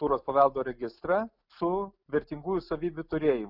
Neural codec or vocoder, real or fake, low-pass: none; real; 5.4 kHz